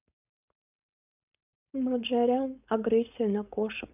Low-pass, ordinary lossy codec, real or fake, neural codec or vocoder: 3.6 kHz; none; fake; codec, 16 kHz, 4.8 kbps, FACodec